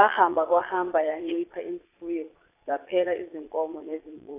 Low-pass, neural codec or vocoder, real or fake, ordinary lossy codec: 3.6 kHz; vocoder, 22.05 kHz, 80 mel bands, Vocos; fake; AAC, 24 kbps